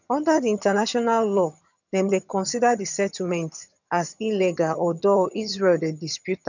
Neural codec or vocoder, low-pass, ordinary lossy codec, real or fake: vocoder, 22.05 kHz, 80 mel bands, HiFi-GAN; 7.2 kHz; none; fake